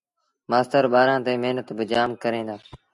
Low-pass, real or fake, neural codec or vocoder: 10.8 kHz; real; none